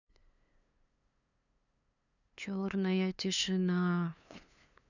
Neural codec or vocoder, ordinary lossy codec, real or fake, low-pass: codec, 16 kHz, 2 kbps, FunCodec, trained on LibriTTS, 25 frames a second; none; fake; 7.2 kHz